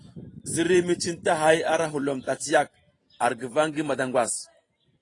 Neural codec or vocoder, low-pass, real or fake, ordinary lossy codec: vocoder, 44.1 kHz, 128 mel bands every 512 samples, BigVGAN v2; 10.8 kHz; fake; AAC, 32 kbps